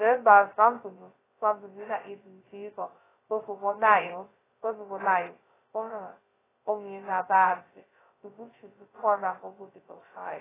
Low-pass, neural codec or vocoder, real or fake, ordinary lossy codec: 3.6 kHz; codec, 16 kHz, 0.2 kbps, FocalCodec; fake; AAC, 16 kbps